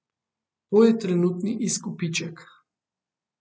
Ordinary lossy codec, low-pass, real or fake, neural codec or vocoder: none; none; real; none